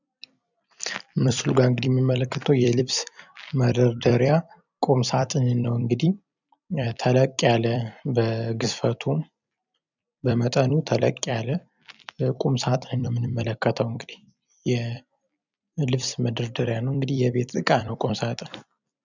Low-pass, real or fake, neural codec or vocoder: 7.2 kHz; fake; vocoder, 44.1 kHz, 128 mel bands every 256 samples, BigVGAN v2